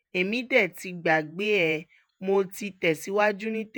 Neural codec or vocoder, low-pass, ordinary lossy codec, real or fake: vocoder, 48 kHz, 128 mel bands, Vocos; none; none; fake